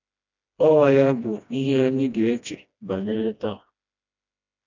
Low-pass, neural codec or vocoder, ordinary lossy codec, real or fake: 7.2 kHz; codec, 16 kHz, 1 kbps, FreqCodec, smaller model; none; fake